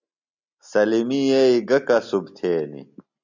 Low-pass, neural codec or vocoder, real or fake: 7.2 kHz; none; real